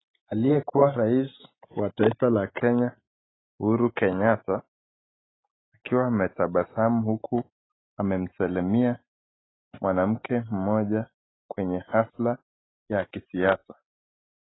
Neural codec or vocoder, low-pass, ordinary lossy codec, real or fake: none; 7.2 kHz; AAC, 16 kbps; real